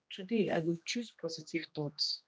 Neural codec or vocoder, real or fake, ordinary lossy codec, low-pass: codec, 16 kHz, 1 kbps, X-Codec, HuBERT features, trained on general audio; fake; none; none